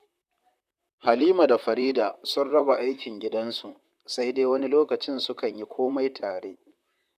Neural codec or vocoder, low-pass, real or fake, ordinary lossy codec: vocoder, 44.1 kHz, 128 mel bands, Pupu-Vocoder; 14.4 kHz; fake; none